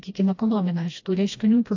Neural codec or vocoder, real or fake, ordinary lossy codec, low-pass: codec, 16 kHz, 1 kbps, FreqCodec, smaller model; fake; AAC, 48 kbps; 7.2 kHz